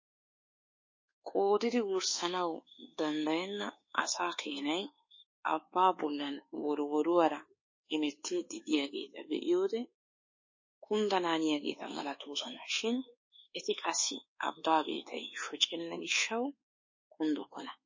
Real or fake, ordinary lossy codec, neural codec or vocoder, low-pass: fake; MP3, 32 kbps; codec, 24 kHz, 1.2 kbps, DualCodec; 7.2 kHz